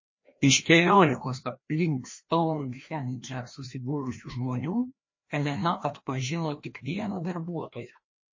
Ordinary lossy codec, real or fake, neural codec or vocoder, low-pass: MP3, 32 kbps; fake; codec, 16 kHz, 1 kbps, FreqCodec, larger model; 7.2 kHz